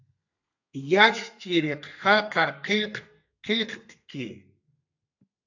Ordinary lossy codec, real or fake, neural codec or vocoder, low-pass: AAC, 48 kbps; fake; codec, 32 kHz, 1.9 kbps, SNAC; 7.2 kHz